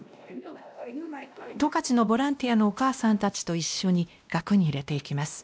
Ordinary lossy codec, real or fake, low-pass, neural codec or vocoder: none; fake; none; codec, 16 kHz, 1 kbps, X-Codec, WavLM features, trained on Multilingual LibriSpeech